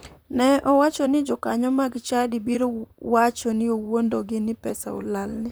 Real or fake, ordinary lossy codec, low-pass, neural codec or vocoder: fake; none; none; vocoder, 44.1 kHz, 128 mel bands, Pupu-Vocoder